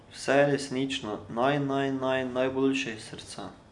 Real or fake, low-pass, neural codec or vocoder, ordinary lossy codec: real; 10.8 kHz; none; none